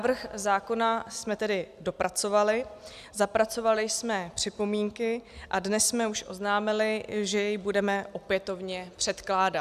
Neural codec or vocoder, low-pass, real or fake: none; 14.4 kHz; real